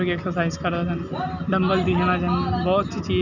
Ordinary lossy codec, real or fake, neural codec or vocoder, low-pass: none; real; none; 7.2 kHz